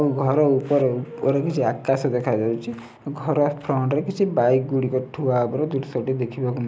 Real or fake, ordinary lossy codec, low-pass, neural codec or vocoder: real; none; none; none